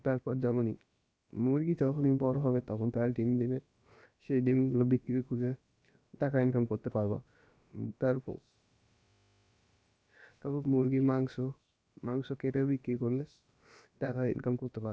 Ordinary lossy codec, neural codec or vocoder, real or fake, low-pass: none; codec, 16 kHz, about 1 kbps, DyCAST, with the encoder's durations; fake; none